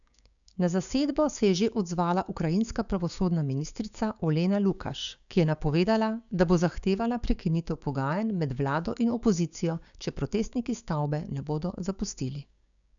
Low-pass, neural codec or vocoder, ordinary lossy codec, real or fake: 7.2 kHz; codec, 16 kHz, 6 kbps, DAC; none; fake